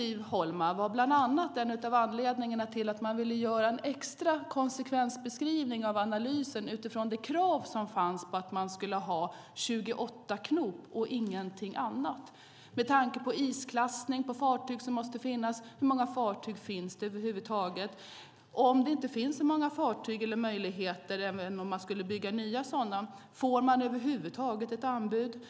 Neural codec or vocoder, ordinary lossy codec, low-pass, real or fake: none; none; none; real